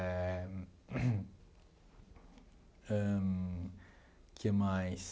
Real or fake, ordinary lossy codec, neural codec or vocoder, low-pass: real; none; none; none